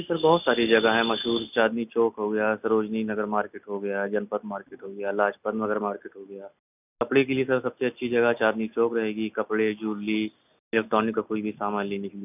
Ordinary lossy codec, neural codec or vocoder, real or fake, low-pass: none; none; real; 3.6 kHz